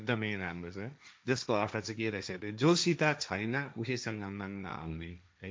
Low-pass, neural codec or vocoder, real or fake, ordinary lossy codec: none; codec, 16 kHz, 1.1 kbps, Voila-Tokenizer; fake; none